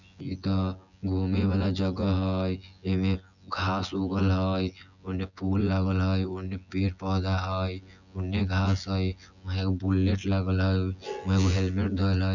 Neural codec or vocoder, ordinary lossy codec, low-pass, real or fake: vocoder, 24 kHz, 100 mel bands, Vocos; none; 7.2 kHz; fake